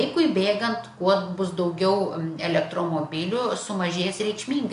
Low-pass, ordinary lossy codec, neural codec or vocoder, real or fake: 10.8 kHz; AAC, 64 kbps; none; real